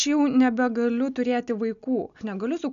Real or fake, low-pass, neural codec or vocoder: real; 7.2 kHz; none